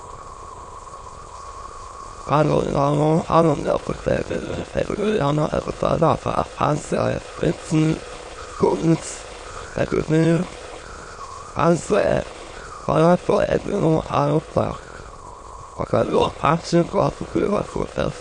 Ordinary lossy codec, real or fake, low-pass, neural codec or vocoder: MP3, 48 kbps; fake; 9.9 kHz; autoencoder, 22.05 kHz, a latent of 192 numbers a frame, VITS, trained on many speakers